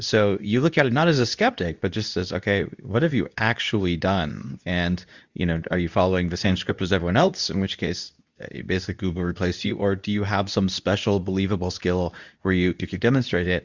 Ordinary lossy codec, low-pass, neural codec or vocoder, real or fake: Opus, 64 kbps; 7.2 kHz; codec, 24 kHz, 0.9 kbps, WavTokenizer, medium speech release version 2; fake